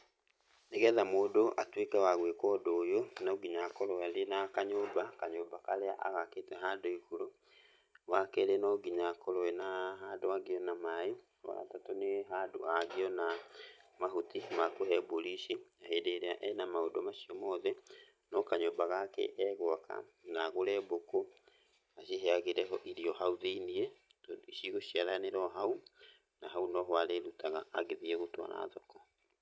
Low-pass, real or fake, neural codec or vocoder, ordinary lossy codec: none; real; none; none